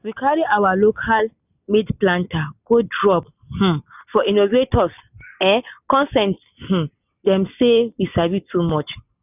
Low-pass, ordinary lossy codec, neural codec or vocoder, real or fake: 3.6 kHz; none; none; real